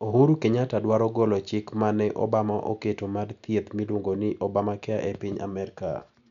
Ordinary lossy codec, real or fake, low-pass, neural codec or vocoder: none; real; 7.2 kHz; none